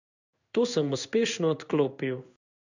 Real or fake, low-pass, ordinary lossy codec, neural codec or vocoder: fake; 7.2 kHz; none; codec, 16 kHz in and 24 kHz out, 1 kbps, XY-Tokenizer